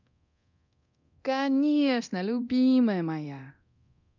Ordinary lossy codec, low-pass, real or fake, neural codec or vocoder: none; 7.2 kHz; fake; codec, 24 kHz, 0.9 kbps, DualCodec